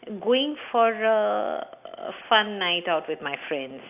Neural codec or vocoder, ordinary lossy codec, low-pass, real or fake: none; AAC, 32 kbps; 3.6 kHz; real